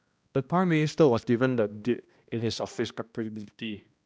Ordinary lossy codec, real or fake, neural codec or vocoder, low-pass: none; fake; codec, 16 kHz, 1 kbps, X-Codec, HuBERT features, trained on balanced general audio; none